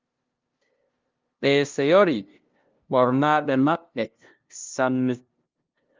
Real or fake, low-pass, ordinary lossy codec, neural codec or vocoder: fake; 7.2 kHz; Opus, 32 kbps; codec, 16 kHz, 0.5 kbps, FunCodec, trained on LibriTTS, 25 frames a second